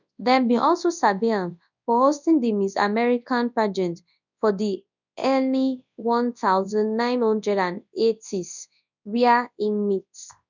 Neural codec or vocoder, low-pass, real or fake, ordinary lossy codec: codec, 24 kHz, 0.9 kbps, WavTokenizer, large speech release; 7.2 kHz; fake; none